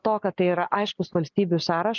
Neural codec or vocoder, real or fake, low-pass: none; real; 7.2 kHz